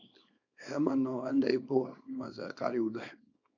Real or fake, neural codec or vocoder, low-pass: fake; codec, 24 kHz, 0.9 kbps, WavTokenizer, small release; 7.2 kHz